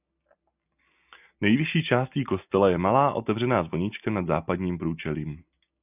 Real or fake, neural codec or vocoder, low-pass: real; none; 3.6 kHz